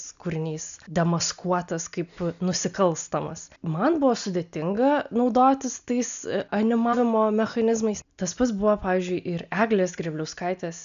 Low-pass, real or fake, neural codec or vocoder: 7.2 kHz; real; none